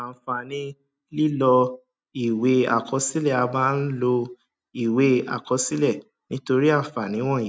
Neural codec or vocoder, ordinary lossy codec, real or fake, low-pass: none; none; real; none